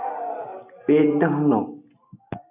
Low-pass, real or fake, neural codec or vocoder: 3.6 kHz; real; none